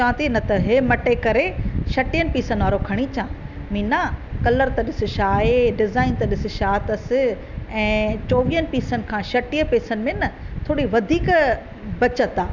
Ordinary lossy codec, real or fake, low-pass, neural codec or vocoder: none; real; 7.2 kHz; none